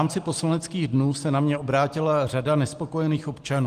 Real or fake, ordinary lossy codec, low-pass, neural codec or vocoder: real; Opus, 24 kbps; 14.4 kHz; none